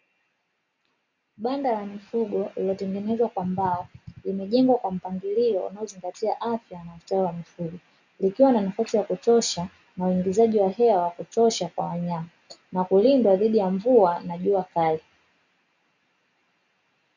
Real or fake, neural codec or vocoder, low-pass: real; none; 7.2 kHz